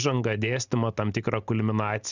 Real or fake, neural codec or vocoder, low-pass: real; none; 7.2 kHz